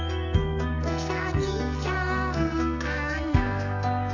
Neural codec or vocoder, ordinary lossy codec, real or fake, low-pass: codec, 44.1 kHz, 2.6 kbps, SNAC; none; fake; 7.2 kHz